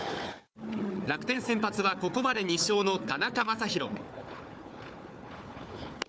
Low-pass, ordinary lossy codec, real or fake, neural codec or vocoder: none; none; fake; codec, 16 kHz, 4 kbps, FunCodec, trained on Chinese and English, 50 frames a second